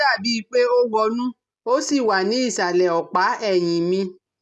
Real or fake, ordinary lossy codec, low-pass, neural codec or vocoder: real; none; none; none